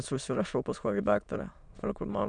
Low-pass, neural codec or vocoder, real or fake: 9.9 kHz; autoencoder, 22.05 kHz, a latent of 192 numbers a frame, VITS, trained on many speakers; fake